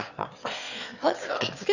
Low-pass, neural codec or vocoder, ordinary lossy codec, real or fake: 7.2 kHz; autoencoder, 22.05 kHz, a latent of 192 numbers a frame, VITS, trained on one speaker; none; fake